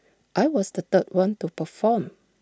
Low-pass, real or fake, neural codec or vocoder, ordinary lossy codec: none; real; none; none